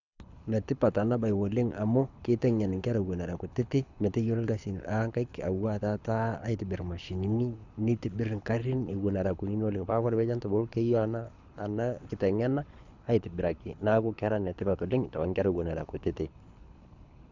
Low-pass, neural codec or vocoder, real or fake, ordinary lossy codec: 7.2 kHz; codec, 24 kHz, 6 kbps, HILCodec; fake; none